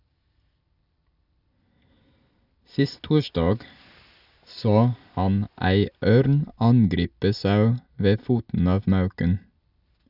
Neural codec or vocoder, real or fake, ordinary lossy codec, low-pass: none; real; none; 5.4 kHz